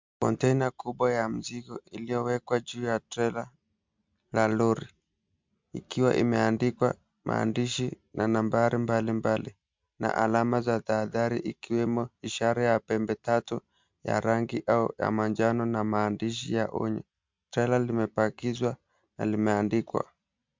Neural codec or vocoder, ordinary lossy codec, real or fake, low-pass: none; MP3, 64 kbps; real; 7.2 kHz